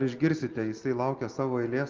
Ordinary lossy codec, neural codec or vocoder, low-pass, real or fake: Opus, 24 kbps; none; 7.2 kHz; real